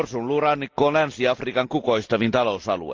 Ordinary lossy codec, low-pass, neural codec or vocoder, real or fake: Opus, 32 kbps; 7.2 kHz; none; real